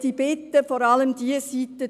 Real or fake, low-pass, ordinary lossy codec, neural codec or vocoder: real; 14.4 kHz; none; none